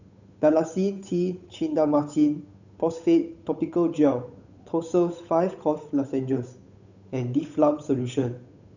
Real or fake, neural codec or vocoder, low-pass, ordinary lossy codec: fake; codec, 16 kHz, 8 kbps, FunCodec, trained on Chinese and English, 25 frames a second; 7.2 kHz; none